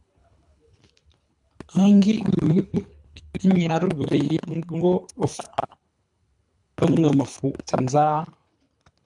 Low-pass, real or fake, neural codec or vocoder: 10.8 kHz; fake; codec, 24 kHz, 3 kbps, HILCodec